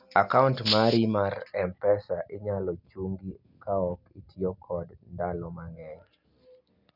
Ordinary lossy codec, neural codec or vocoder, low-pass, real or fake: none; none; 5.4 kHz; real